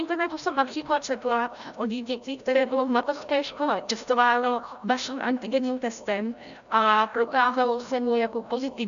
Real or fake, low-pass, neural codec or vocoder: fake; 7.2 kHz; codec, 16 kHz, 0.5 kbps, FreqCodec, larger model